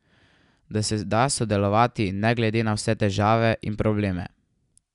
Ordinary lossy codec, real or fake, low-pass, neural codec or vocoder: none; real; 10.8 kHz; none